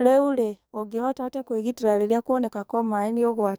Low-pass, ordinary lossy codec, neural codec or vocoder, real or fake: none; none; codec, 44.1 kHz, 2.6 kbps, SNAC; fake